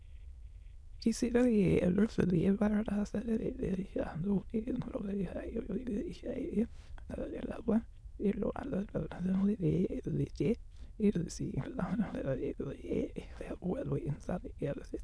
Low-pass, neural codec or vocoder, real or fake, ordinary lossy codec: none; autoencoder, 22.05 kHz, a latent of 192 numbers a frame, VITS, trained on many speakers; fake; none